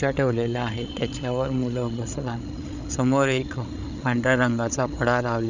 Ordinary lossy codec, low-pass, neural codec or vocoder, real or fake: none; 7.2 kHz; codec, 16 kHz, 8 kbps, FreqCodec, larger model; fake